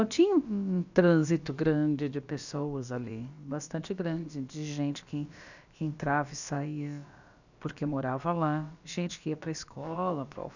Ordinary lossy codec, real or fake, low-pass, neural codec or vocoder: none; fake; 7.2 kHz; codec, 16 kHz, about 1 kbps, DyCAST, with the encoder's durations